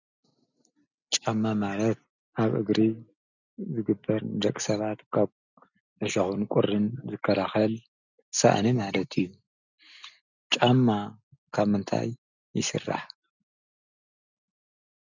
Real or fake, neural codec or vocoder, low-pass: real; none; 7.2 kHz